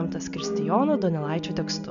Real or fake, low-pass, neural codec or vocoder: real; 7.2 kHz; none